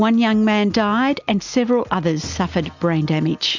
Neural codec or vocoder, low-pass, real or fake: none; 7.2 kHz; real